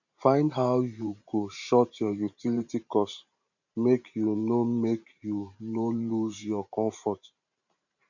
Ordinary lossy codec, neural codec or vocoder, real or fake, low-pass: none; vocoder, 44.1 kHz, 128 mel bands every 512 samples, BigVGAN v2; fake; 7.2 kHz